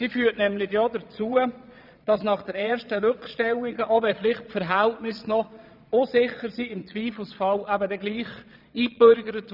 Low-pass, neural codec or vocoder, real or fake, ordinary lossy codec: 5.4 kHz; vocoder, 22.05 kHz, 80 mel bands, Vocos; fake; none